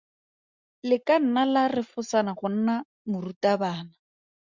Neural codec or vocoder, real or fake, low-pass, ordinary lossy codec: none; real; 7.2 kHz; Opus, 64 kbps